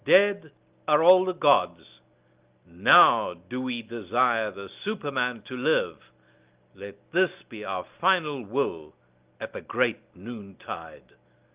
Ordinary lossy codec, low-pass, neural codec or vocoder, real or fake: Opus, 32 kbps; 3.6 kHz; none; real